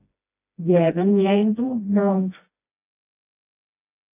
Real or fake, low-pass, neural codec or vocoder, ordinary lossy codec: fake; 3.6 kHz; codec, 16 kHz, 1 kbps, FreqCodec, smaller model; AAC, 24 kbps